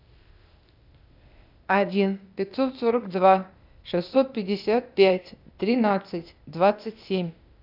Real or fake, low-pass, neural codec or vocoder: fake; 5.4 kHz; codec, 16 kHz, 0.8 kbps, ZipCodec